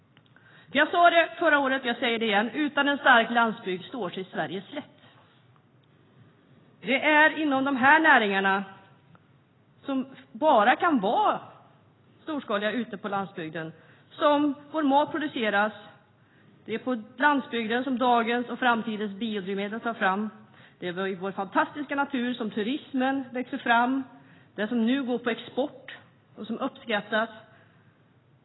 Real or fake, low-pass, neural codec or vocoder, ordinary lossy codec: real; 7.2 kHz; none; AAC, 16 kbps